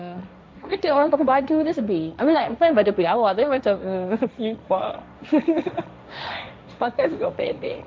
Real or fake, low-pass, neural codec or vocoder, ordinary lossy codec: fake; none; codec, 16 kHz, 1.1 kbps, Voila-Tokenizer; none